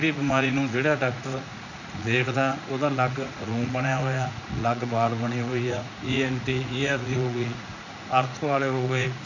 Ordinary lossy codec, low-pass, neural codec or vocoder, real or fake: none; 7.2 kHz; vocoder, 44.1 kHz, 80 mel bands, Vocos; fake